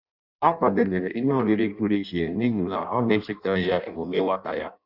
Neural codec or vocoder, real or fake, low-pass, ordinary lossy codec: codec, 16 kHz in and 24 kHz out, 0.6 kbps, FireRedTTS-2 codec; fake; 5.4 kHz; none